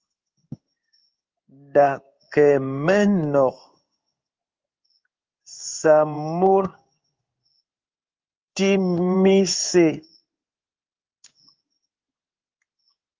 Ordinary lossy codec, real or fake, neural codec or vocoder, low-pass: Opus, 32 kbps; fake; codec, 16 kHz in and 24 kHz out, 1 kbps, XY-Tokenizer; 7.2 kHz